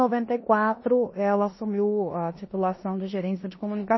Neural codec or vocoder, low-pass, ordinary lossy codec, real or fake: codec, 16 kHz in and 24 kHz out, 0.9 kbps, LongCat-Audio-Codec, four codebook decoder; 7.2 kHz; MP3, 24 kbps; fake